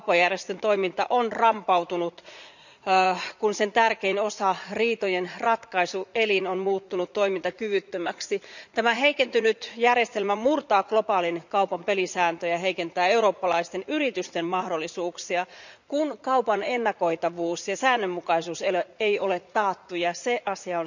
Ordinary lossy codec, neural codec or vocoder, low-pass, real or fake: none; vocoder, 44.1 kHz, 80 mel bands, Vocos; 7.2 kHz; fake